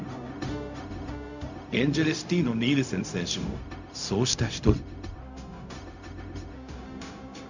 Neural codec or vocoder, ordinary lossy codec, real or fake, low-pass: codec, 16 kHz, 0.4 kbps, LongCat-Audio-Codec; none; fake; 7.2 kHz